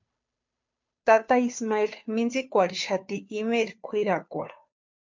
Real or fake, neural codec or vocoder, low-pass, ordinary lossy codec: fake; codec, 16 kHz, 2 kbps, FunCodec, trained on Chinese and English, 25 frames a second; 7.2 kHz; MP3, 48 kbps